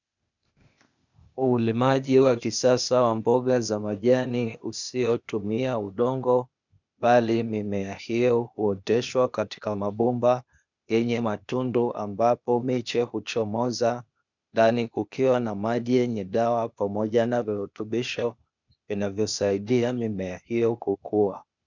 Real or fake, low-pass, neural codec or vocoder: fake; 7.2 kHz; codec, 16 kHz, 0.8 kbps, ZipCodec